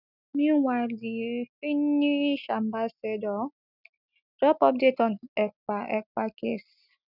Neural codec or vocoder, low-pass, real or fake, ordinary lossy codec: none; 5.4 kHz; real; none